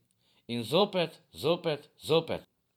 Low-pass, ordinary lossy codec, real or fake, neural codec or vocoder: 19.8 kHz; none; fake; vocoder, 44.1 kHz, 128 mel bands every 512 samples, BigVGAN v2